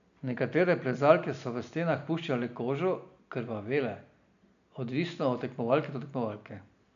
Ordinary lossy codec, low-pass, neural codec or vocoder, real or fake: none; 7.2 kHz; none; real